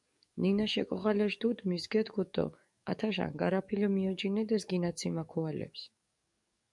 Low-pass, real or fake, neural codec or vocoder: 10.8 kHz; fake; codec, 44.1 kHz, 7.8 kbps, DAC